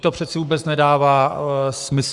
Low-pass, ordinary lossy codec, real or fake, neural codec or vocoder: 10.8 kHz; Opus, 64 kbps; fake; codec, 44.1 kHz, 7.8 kbps, Pupu-Codec